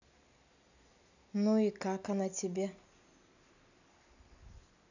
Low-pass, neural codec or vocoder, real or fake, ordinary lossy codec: 7.2 kHz; none; real; none